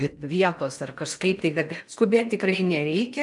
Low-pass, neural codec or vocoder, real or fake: 10.8 kHz; codec, 16 kHz in and 24 kHz out, 0.6 kbps, FocalCodec, streaming, 4096 codes; fake